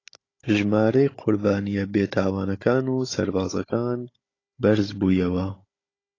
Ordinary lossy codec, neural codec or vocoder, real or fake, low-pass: AAC, 32 kbps; codec, 16 kHz, 16 kbps, FunCodec, trained on Chinese and English, 50 frames a second; fake; 7.2 kHz